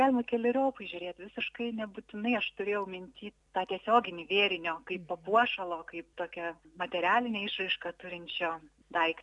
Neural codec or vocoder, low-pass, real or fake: none; 10.8 kHz; real